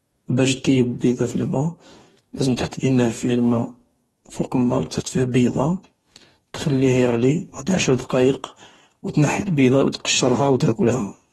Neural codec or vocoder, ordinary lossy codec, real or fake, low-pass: codec, 44.1 kHz, 2.6 kbps, DAC; AAC, 32 kbps; fake; 19.8 kHz